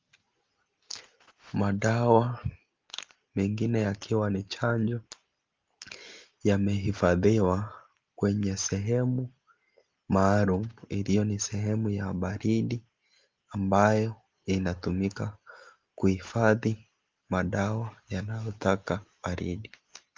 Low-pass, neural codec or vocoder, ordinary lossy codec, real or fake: 7.2 kHz; none; Opus, 32 kbps; real